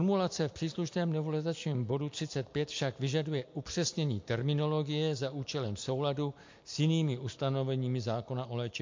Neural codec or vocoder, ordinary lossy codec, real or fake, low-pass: none; MP3, 48 kbps; real; 7.2 kHz